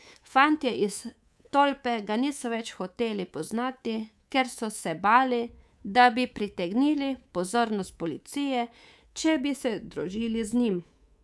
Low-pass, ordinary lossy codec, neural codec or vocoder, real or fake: none; none; codec, 24 kHz, 3.1 kbps, DualCodec; fake